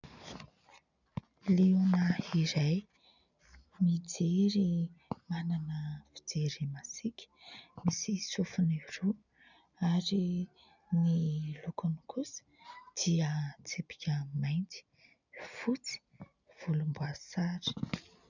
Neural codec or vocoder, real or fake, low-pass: none; real; 7.2 kHz